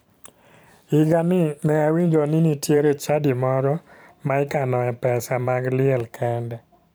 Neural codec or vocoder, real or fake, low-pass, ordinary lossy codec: vocoder, 44.1 kHz, 128 mel bands every 512 samples, BigVGAN v2; fake; none; none